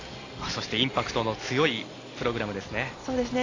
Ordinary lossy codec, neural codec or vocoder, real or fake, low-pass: AAC, 32 kbps; none; real; 7.2 kHz